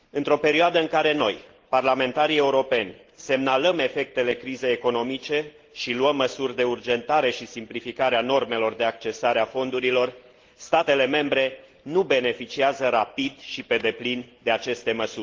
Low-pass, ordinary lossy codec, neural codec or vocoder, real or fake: 7.2 kHz; Opus, 16 kbps; none; real